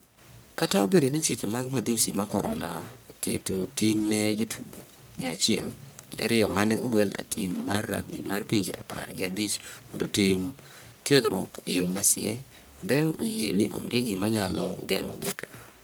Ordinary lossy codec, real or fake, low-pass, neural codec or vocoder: none; fake; none; codec, 44.1 kHz, 1.7 kbps, Pupu-Codec